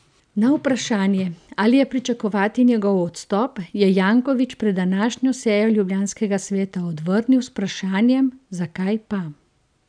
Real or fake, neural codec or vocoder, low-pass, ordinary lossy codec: real; none; 9.9 kHz; none